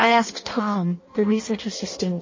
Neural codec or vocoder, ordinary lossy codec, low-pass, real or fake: codec, 16 kHz in and 24 kHz out, 0.6 kbps, FireRedTTS-2 codec; MP3, 32 kbps; 7.2 kHz; fake